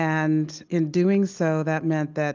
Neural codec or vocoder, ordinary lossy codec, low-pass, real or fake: none; Opus, 32 kbps; 7.2 kHz; real